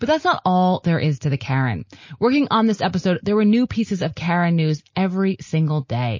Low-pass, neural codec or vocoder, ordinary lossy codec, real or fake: 7.2 kHz; none; MP3, 32 kbps; real